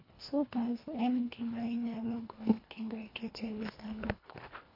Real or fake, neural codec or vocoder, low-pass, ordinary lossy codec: fake; codec, 24 kHz, 1.5 kbps, HILCodec; 5.4 kHz; MP3, 32 kbps